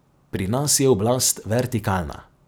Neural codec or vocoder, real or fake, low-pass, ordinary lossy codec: none; real; none; none